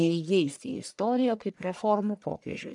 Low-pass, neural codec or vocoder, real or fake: 10.8 kHz; codec, 44.1 kHz, 1.7 kbps, Pupu-Codec; fake